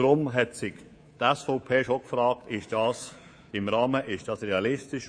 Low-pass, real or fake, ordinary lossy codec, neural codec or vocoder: 9.9 kHz; fake; MP3, 48 kbps; codec, 24 kHz, 3.1 kbps, DualCodec